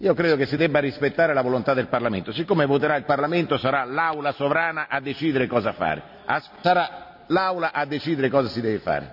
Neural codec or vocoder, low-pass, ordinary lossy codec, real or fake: none; 5.4 kHz; none; real